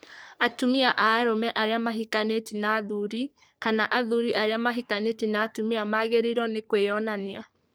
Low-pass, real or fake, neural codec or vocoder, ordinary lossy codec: none; fake; codec, 44.1 kHz, 3.4 kbps, Pupu-Codec; none